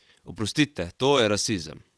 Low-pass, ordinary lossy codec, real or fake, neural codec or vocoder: none; none; fake; vocoder, 22.05 kHz, 80 mel bands, WaveNeXt